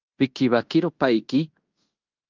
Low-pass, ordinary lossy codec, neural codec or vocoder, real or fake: 7.2 kHz; Opus, 24 kbps; codec, 16 kHz in and 24 kHz out, 0.9 kbps, LongCat-Audio-Codec, fine tuned four codebook decoder; fake